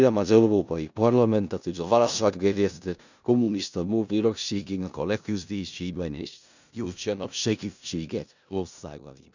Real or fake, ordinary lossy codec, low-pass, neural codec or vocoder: fake; none; 7.2 kHz; codec, 16 kHz in and 24 kHz out, 0.4 kbps, LongCat-Audio-Codec, four codebook decoder